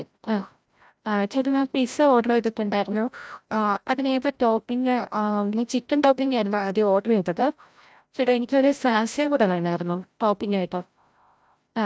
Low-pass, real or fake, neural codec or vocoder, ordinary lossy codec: none; fake; codec, 16 kHz, 0.5 kbps, FreqCodec, larger model; none